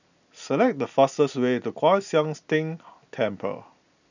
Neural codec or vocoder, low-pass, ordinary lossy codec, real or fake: none; 7.2 kHz; none; real